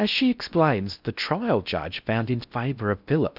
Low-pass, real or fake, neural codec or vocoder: 5.4 kHz; fake; codec, 16 kHz in and 24 kHz out, 0.6 kbps, FocalCodec, streaming, 4096 codes